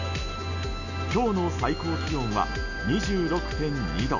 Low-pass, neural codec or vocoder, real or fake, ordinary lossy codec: 7.2 kHz; none; real; none